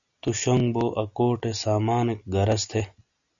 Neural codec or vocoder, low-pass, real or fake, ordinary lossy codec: none; 7.2 kHz; real; MP3, 64 kbps